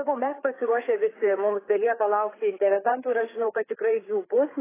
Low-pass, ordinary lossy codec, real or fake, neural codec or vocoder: 3.6 kHz; AAC, 16 kbps; fake; codec, 16 kHz, 4 kbps, FreqCodec, larger model